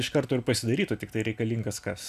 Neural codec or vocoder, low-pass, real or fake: none; 14.4 kHz; real